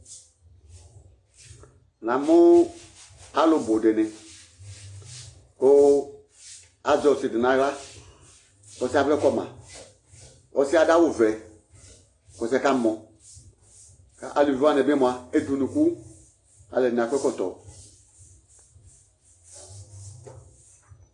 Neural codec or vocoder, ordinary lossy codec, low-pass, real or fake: none; AAC, 32 kbps; 10.8 kHz; real